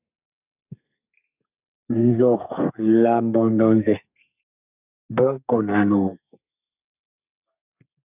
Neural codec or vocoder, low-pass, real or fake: codec, 32 kHz, 1.9 kbps, SNAC; 3.6 kHz; fake